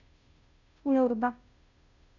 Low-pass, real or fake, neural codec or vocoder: 7.2 kHz; fake; codec, 16 kHz, 0.5 kbps, FunCodec, trained on Chinese and English, 25 frames a second